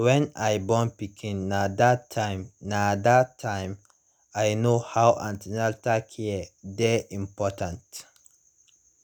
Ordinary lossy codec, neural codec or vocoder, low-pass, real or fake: none; none; none; real